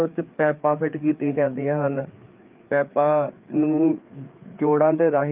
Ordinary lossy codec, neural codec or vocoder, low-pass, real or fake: Opus, 32 kbps; codec, 16 kHz, 4 kbps, FreqCodec, larger model; 3.6 kHz; fake